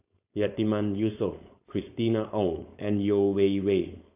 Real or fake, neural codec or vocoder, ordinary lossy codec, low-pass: fake; codec, 16 kHz, 4.8 kbps, FACodec; AAC, 32 kbps; 3.6 kHz